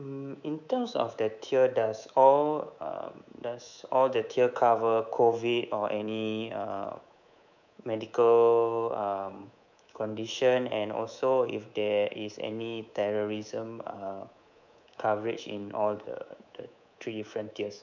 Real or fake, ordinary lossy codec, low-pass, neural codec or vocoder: fake; none; 7.2 kHz; codec, 24 kHz, 3.1 kbps, DualCodec